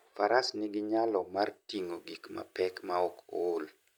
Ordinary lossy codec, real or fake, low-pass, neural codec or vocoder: none; real; none; none